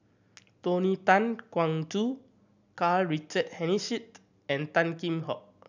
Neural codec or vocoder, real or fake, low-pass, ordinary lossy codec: none; real; 7.2 kHz; none